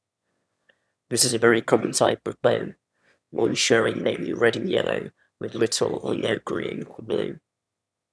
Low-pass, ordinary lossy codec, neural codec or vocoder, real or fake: none; none; autoencoder, 22.05 kHz, a latent of 192 numbers a frame, VITS, trained on one speaker; fake